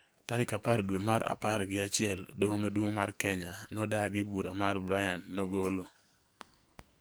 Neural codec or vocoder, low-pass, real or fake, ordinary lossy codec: codec, 44.1 kHz, 2.6 kbps, SNAC; none; fake; none